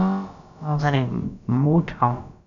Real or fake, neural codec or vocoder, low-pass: fake; codec, 16 kHz, about 1 kbps, DyCAST, with the encoder's durations; 7.2 kHz